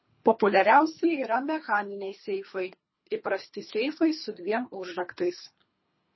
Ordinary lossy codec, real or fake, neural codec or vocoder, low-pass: MP3, 24 kbps; fake; codec, 24 kHz, 3 kbps, HILCodec; 7.2 kHz